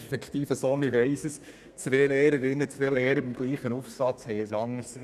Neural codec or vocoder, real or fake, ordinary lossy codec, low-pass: codec, 32 kHz, 1.9 kbps, SNAC; fake; none; 14.4 kHz